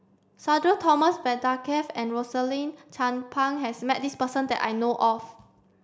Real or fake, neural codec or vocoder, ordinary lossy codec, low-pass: real; none; none; none